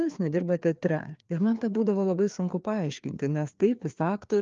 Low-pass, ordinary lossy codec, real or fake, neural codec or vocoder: 7.2 kHz; Opus, 32 kbps; fake; codec, 16 kHz, 2 kbps, FreqCodec, larger model